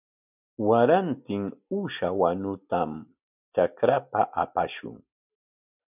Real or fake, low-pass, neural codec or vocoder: real; 3.6 kHz; none